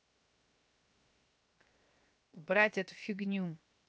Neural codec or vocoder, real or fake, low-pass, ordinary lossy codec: codec, 16 kHz, 0.7 kbps, FocalCodec; fake; none; none